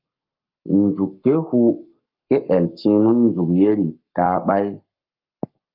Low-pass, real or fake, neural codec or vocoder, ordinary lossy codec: 5.4 kHz; fake; codec, 44.1 kHz, 7.8 kbps, Pupu-Codec; Opus, 24 kbps